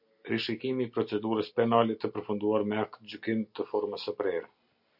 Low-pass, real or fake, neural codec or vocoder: 5.4 kHz; real; none